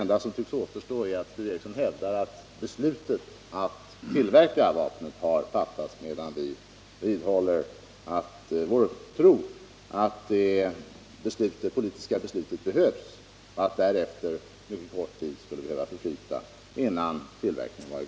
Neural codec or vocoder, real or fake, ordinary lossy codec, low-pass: none; real; none; none